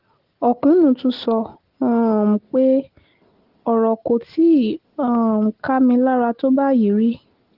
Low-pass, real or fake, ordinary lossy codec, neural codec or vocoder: 5.4 kHz; real; Opus, 16 kbps; none